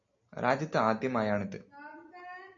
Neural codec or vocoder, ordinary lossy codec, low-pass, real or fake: none; MP3, 48 kbps; 7.2 kHz; real